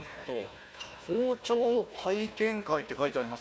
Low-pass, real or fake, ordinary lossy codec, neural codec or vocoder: none; fake; none; codec, 16 kHz, 1 kbps, FunCodec, trained on Chinese and English, 50 frames a second